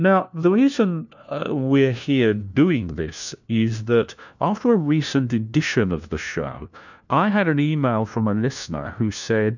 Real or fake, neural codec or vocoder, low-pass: fake; codec, 16 kHz, 1 kbps, FunCodec, trained on LibriTTS, 50 frames a second; 7.2 kHz